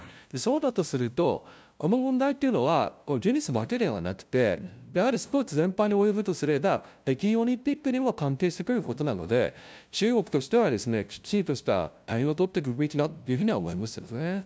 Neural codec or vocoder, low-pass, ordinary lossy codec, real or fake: codec, 16 kHz, 0.5 kbps, FunCodec, trained on LibriTTS, 25 frames a second; none; none; fake